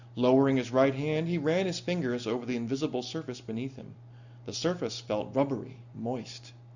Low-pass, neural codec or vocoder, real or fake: 7.2 kHz; none; real